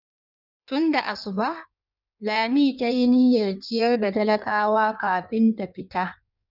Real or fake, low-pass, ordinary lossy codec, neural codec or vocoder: fake; 5.4 kHz; none; codec, 16 kHz in and 24 kHz out, 1.1 kbps, FireRedTTS-2 codec